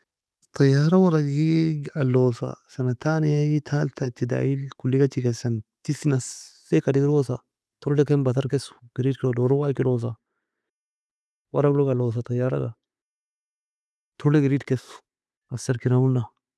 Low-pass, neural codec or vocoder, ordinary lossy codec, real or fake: none; none; none; real